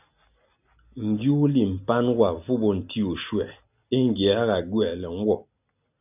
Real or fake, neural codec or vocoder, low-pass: real; none; 3.6 kHz